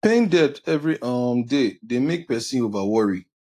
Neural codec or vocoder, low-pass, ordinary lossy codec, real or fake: none; 14.4 kHz; AAC, 64 kbps; real